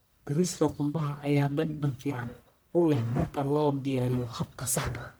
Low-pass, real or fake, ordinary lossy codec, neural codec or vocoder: none; fake; none; codec, 44.1 kHz, 1.7 kbps, Pupu-Codec